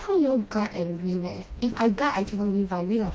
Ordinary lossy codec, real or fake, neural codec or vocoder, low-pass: none; fake; codec, 16 kHz, 1 kbps, FreqCodec, smaller model; none